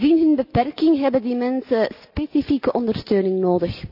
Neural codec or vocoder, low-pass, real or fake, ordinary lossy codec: none; 5.4 kHz; real; none